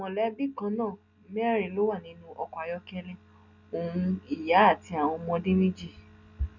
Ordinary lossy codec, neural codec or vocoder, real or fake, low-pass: none; none; real; 7.2 kHz